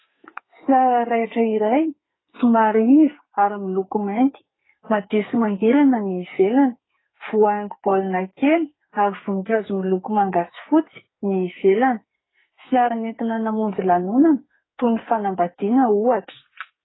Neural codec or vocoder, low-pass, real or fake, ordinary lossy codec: codec, 44.1 kHz, 2.6 kbps, SNAC; 7.2 kHz; fake; AAC, 16 kbps